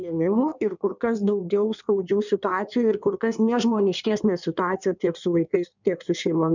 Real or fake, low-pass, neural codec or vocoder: fake; 7.2 kHz; codec, 16 kHz in and 24 kHz out, 1.1 kbps, FireRedTTS-2 codec